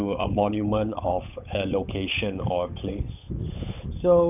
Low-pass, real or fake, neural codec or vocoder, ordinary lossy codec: 3.6 kHz; fake; codec, 16 kHz, 8 kbps, FunCodec, trained on Chinese and English, 25 frames a second; none